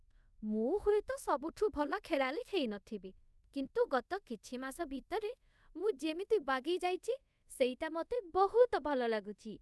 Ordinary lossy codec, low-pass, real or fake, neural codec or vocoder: none; none; fake; codec, 24 kHz, 0.5 kbps, DualCodec